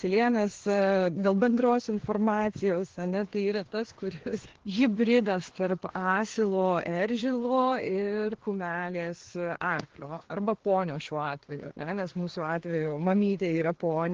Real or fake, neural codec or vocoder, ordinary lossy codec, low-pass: fake; codec, 16 kHz, 2 kbps, FreqCodec, larger model; Opus, 16 kbps; 7.2 kHz